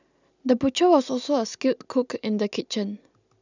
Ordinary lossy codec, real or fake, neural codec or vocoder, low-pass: none; real; none; 7.2 kHz